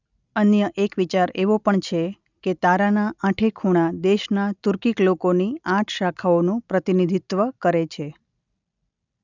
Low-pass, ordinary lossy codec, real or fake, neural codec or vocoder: 7.2 kHz; none; real; none